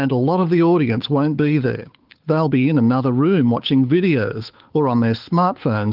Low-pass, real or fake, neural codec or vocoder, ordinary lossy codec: 5.4 kHz; fake; codec, 16 kHz, 8 kbps, FunCodec, trained on Chinese and English, 25 frames a second; Opus, 32 kbps